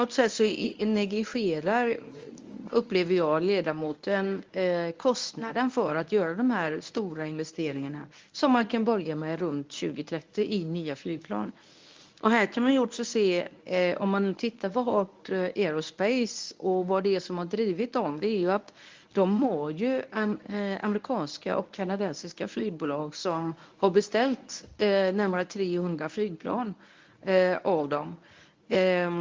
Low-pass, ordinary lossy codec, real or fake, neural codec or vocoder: 7.2 kHz; Opus, 32 kbps; fake; codec, 24 kHz, 0.9 kbps, WavTokenizer, medium speech release version 1